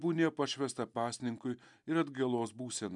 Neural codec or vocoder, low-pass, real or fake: none; 10.8 kHz; real